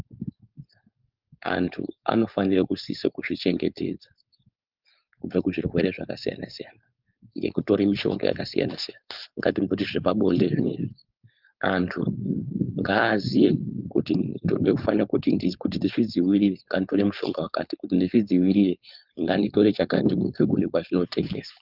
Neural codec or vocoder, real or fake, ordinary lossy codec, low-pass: codec, 16 kHz, 4.8 kbps, FACodec; fake; Opus, 24 kbps; 5.4 kHz